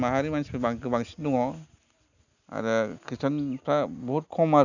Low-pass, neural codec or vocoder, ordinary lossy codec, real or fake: 7.2 kHz; none; none; real